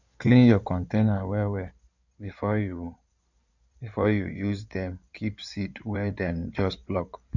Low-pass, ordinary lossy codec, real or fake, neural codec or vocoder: 7.2 kHz; none; fake; codec, 16 kHz in and 24 kHz out, 2.2 kbps, FireRedTTS-2 codec